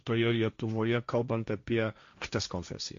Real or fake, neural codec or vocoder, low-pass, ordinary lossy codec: fake; codec, 16 kHz, 1.1 kbps, Voila-Tokenizer; 7.2 kHz; MP3, 48 kbps